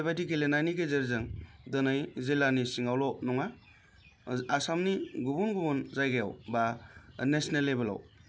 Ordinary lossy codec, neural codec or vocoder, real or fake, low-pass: none; none; real; none